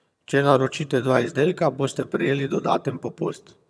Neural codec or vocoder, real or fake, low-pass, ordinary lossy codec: vocoder, 22.05 kHz, 80 mel bands, HiFi-GAN; fake; none; none